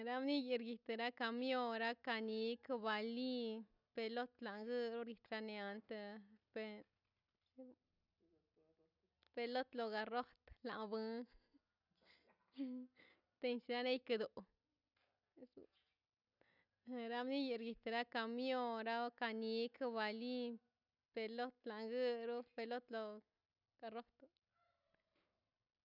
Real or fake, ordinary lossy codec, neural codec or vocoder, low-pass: real; none; none; 5.4 kHz